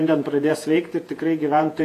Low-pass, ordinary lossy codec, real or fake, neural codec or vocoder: 14.4 kHz; AAC, 64 kbps; fake; vocoder, 48 kHz, 128 mel bands, Vocos